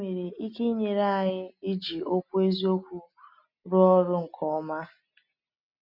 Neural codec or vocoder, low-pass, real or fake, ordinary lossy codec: none; 5.4 kHz; real; MP3, 48 kbps